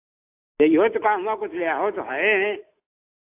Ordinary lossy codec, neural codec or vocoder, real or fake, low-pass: AAC, 24 kbps; none; real; 3.6 kHz